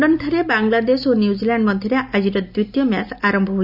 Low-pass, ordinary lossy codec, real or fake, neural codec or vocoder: 5.4 kHz; Opus, 64 kbps; real; none